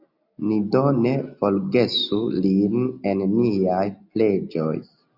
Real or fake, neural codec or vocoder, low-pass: real; none; 5.4 kHz